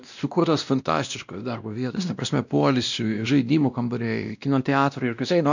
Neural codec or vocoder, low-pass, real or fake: codec, 16 kHz, 1 kbps, X-Codec, WavLM features, trained on Multilingual LibriSpeech; 7.2 kHz; fake